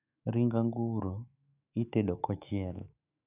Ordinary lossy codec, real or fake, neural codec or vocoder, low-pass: none; fake; autoencoder, 48 kHz, 128 numbers a frame, DAC-VAE, trained on Japanese speech; 3.6 kHz